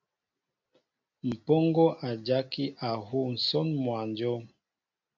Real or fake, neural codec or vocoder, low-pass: real; none; 7.2 kHz